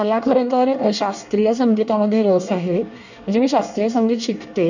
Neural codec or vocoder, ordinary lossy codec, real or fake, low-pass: codec, 24 kHz, 1 kbps, SNAC; none; fake; 7.2 kHz